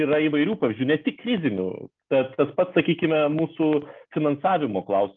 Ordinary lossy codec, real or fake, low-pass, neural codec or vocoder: Opus, 24 kbps; real; 7.2 kHz; none